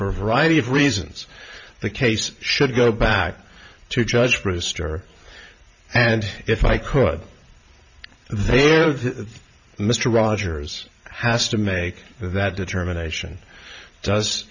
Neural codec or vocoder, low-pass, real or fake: vocoder, 44.1 kHz, 128 mel bands every 512 samples, BigVGAN v2; 7.2 kHz; fake